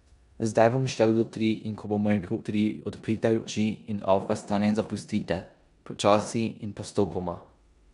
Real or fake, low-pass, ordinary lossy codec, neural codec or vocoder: fake; 10.8 kHz; none; codec, 16 kHz in and 24 kHz out, 0.9 kbps, LongCat-Audio-Codec, four codebook decoder